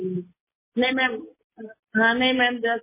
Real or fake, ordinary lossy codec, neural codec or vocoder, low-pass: real; MP3, 16 kbps; none; 3.6 kHz